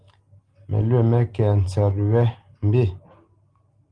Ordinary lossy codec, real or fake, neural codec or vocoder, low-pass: Opus, 24 kbps; real; none; 9.9 kHz